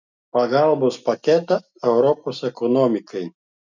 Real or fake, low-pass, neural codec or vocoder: real; 7.2 kHz; none